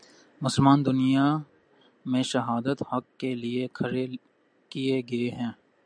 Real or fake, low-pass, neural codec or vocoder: real; 9.9 kHz; none